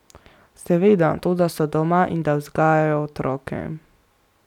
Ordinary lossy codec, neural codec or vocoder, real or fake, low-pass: none; none; real; 19.8 kHz